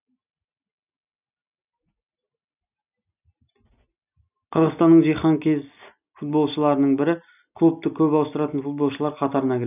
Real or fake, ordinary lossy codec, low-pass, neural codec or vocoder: real; none; 3.6 kHz; none